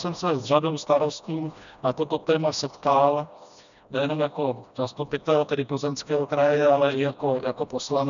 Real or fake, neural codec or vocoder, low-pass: fake; codec, 16 kHz, 1 kbps, FreqCodec, smaller model; 7.2 kHz